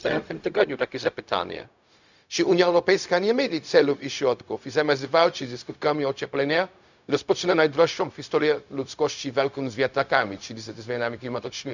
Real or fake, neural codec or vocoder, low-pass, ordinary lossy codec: fake; codec, 16 kHz, 0.4 kbps, LongCat-Audio-Codec; 7.2 kHz; none